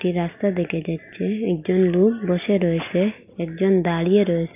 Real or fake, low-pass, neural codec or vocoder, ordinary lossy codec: real; 3.6 kHz; none; AAC, 32 kbps